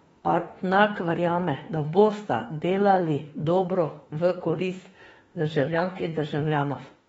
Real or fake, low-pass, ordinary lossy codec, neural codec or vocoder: fake; 19.8 kHz; AAC, 24 kbps; autoencoder, 48 kHz, 32 numbers a frame, DAC-VAE, trained on Japanese speech